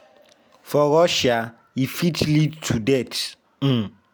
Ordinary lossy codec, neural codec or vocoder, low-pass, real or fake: none; none; none; real